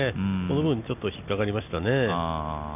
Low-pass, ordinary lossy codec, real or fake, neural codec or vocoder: 3.6 kHz; none; real; none